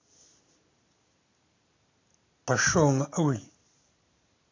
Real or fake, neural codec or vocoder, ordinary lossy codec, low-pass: real; none; AAC, 32 kbps; 7.2 kHz